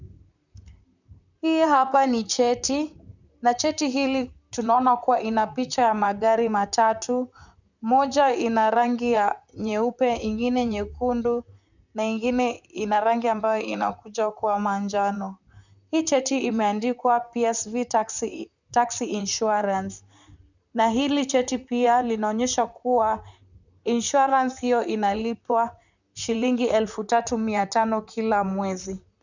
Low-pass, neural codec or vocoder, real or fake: 7.2 kHz; vocoder, 44.1 kHz, 128 mel bands, Pupu-Vocoder; fake